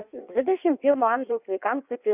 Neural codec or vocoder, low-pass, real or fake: codec, 16 kHz in and 24 kHz out, 1.1 kbps, FireRedTTS-2 codec; 3.6 kHz; fake